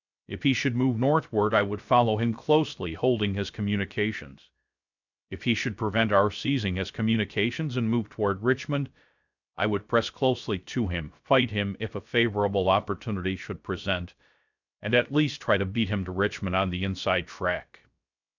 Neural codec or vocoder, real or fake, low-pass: codec, 16 kHz, 0.3 kbps, FocalCodec; fake; 7.2 kHz